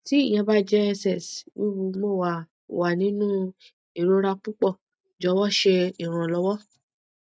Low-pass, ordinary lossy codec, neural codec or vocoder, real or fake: none; none; none; real